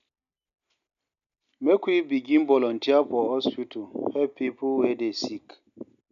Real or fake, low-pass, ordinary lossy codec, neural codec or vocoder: real; 7.2 kHz; none; none